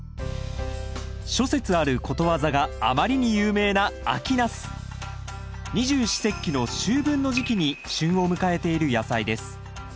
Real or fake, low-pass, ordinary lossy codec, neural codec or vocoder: real; none; none; none